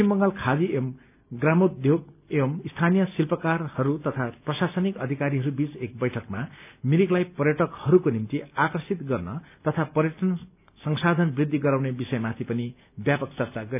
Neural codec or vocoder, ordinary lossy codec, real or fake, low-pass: none; none; real; 3.6 kHz